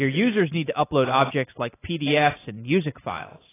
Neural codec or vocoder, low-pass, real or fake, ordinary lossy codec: none; 3.6 kHz; real; AAC, 16 kbps